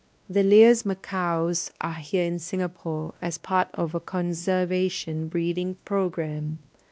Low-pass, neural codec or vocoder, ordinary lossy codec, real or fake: none; codec, 16 kHz, 1 kbps, X-Codec, WavLM features, trained on Multilingual LibriSpeech; none; fake